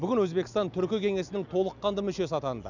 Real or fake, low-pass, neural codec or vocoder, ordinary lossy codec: real; 7.2 kHz; none; none